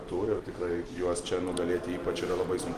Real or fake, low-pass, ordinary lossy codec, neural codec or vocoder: real; 14.4 kHz; Opus, 24 kbps; none